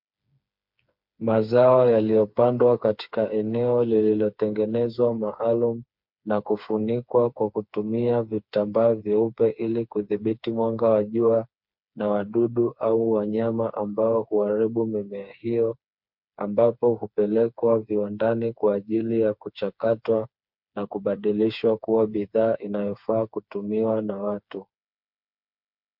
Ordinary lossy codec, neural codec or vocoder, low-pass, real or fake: MP3, 48 kbps; codec, 16 kHz, 4 kbps, FreqCodec, smaller model; 5.4 kHz; fake